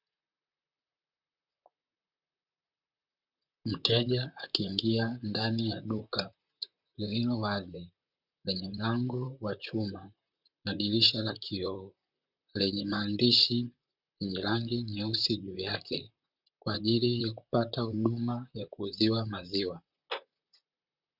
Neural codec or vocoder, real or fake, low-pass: vocoder, 44.1 kHz, 128 mel bands, Pupu-Vocoder; fake; 5.4 kHz